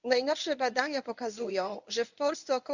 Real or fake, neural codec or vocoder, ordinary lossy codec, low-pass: fake; codec, 24 kHz, 0.9 kbps, WavTokenizer, medium speech release version 1; none; 7.2 kHz